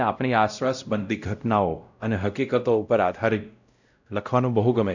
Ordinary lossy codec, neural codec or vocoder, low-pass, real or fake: none; codec, 16 kHz, 0.5 kbps, X-Codec, WavLM features, trained on Multilingual LibriSpeech; 7.2 kHz; fake